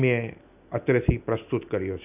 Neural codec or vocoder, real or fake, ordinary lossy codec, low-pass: none; real; none; 3.6 kHz